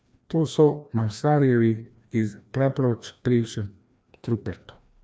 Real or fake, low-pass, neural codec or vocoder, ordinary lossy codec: fake; none; codec, 16 kHz, 1 kbps, FreqCodec, larger model; none